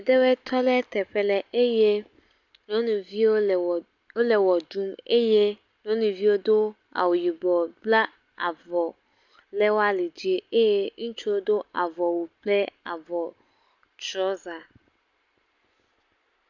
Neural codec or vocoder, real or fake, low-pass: none; real; 7.2 kHz